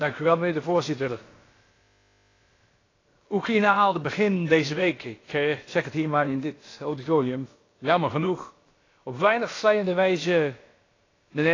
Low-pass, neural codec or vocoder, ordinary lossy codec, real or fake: 7.2 kHz; codec, 16 kHz, about 1 kbps, DyCAST, with the encoder's durations; AAC, 32 kbps; fake